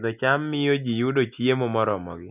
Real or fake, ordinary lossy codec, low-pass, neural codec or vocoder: real; none; 3.6 kHz; none